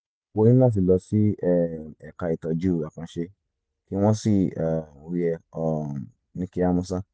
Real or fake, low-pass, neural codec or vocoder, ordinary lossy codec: real; none; none; none